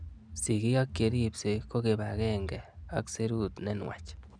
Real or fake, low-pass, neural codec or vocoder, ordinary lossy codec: real; 9.9 kHz; none; none